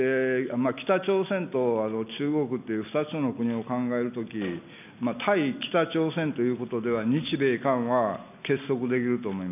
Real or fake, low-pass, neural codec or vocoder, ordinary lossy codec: real; 3.6 kHz; none; none